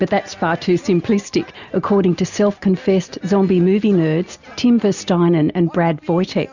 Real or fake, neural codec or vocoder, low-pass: real; none; 7.2 kHz